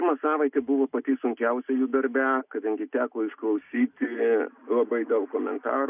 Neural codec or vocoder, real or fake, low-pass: none; real; 3.6 kHz